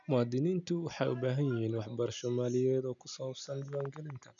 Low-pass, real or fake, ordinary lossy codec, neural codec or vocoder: 7.2 kHz; real; none; none